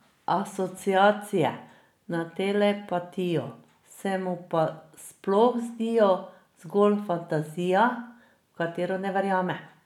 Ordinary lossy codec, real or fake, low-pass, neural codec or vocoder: none; real; 19.8 kHz; none